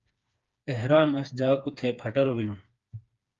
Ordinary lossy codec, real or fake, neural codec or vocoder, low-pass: Opus, 32 kbps; fake; codec, 16 kHz, 4 kbps, FreqCodec, smaller model; 7.2 kHz